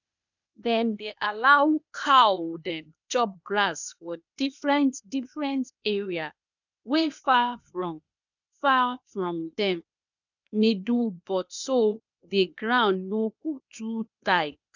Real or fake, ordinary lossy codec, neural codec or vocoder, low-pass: fake; none; codec, 16 kHz, 0.8 kbps, ZipCodec; 7.2 kHz